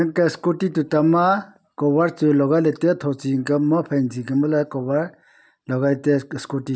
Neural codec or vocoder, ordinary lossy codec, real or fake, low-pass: none; none; real; none